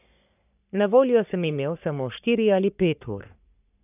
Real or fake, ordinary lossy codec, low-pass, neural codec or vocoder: fake; none; 3.6 kHz; codec, 44.1 kHz, 3.4 kbps, Pupu-Codec